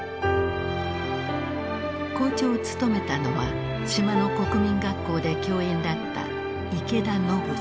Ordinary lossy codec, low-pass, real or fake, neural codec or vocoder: none; none; real; none